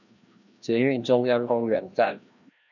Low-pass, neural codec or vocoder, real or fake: 7.2 kHz; codec, 16 kHz, 1 kbps, FreqCodec, larger model; fake